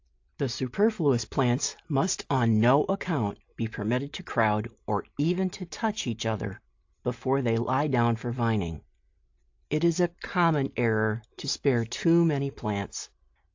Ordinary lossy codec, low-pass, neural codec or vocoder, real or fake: AAC, 48 kbps; 7.2 kHz; none; real